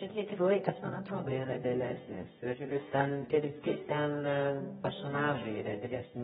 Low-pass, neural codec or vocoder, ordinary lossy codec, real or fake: 10.8 kHz; codec, 24 kHz, 0.9 kbps, WavTokenizer, medium music audio release; AAC, 16 kbps; fake